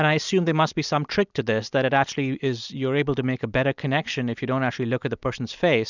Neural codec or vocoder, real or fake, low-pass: none; real; 7.2 kHz